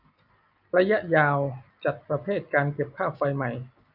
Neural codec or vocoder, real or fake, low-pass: none; real; 5.4 kHz